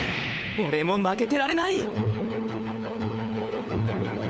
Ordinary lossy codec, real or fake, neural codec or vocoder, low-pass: none; fake; codec, 16 kHz, 4 kbps, FunCodec, trained on LibriTTS, 50 frames a second; none